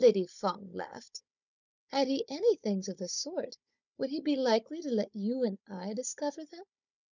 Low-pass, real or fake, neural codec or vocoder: 7.2 kHz; fake; codec, 16 kHz, 16 kbps, FunCodec, trained on Chinese and English, 50 frames a second